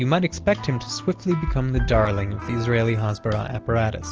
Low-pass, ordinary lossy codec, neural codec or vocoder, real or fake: 7.2 kHz; Opus, 16 kbps; none; real